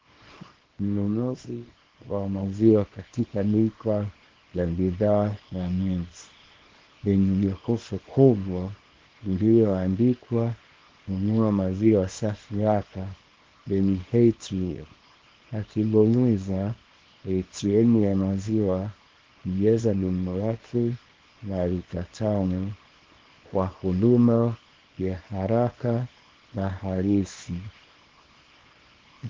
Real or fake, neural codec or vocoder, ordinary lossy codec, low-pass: fake; codec, 24 kHz, 0.9 kbps, WavTokenizer, small release; Opus, 16 kbps; 7.2 kHz